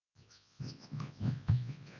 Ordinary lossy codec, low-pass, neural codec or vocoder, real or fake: none; 7.2 kHz; codec, 24 kHz, 0.9 kbps, WavTokenizer, large speech release; fake